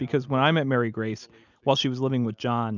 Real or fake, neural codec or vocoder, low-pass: real; none; 7.2 kHz